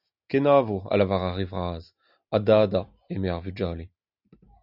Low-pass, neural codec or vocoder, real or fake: 5.4 kHz; none; real